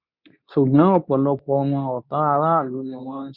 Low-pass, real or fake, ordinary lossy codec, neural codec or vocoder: 5.4 kHz; fake; none; codec, 24 kHz, 0.9 kbps, WavTokenizer, medium speech release version 2